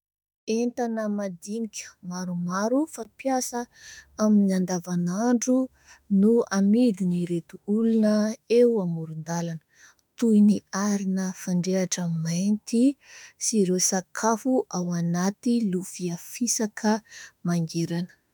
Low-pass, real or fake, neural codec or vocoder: 19.8 kHz; fake; autoencoder, 48 kHz, 32 numbers a frame, DAC-VAE, trained on Japanese speech